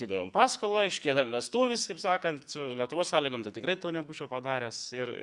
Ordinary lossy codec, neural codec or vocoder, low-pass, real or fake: Opus, 64 kbps; codec, 44.1 kHz, 2.6 kbps, SNAC; 10.8 kHz; fake